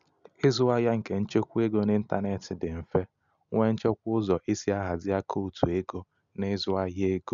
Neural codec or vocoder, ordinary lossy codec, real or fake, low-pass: none; none; real; 7.2 kHz